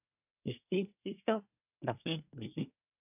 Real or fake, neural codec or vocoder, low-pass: fake; codec, 24 kHz, 1 kbps, SNAC; 3.6 kHz